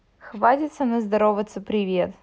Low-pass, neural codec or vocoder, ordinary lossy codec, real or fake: none; none; none; real